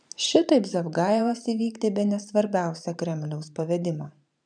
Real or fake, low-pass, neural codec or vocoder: fake; 9.9 kHz; vocoder, 44.1 kHz, 128 mel bands every 512 samples, BigVGAN v2